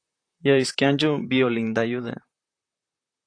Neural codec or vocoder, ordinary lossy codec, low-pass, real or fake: vocoder, 44.1 kHz, 128 mel bands, Pupu-Vocoder; AAC, 48 kbps; 9.9 kHz; fake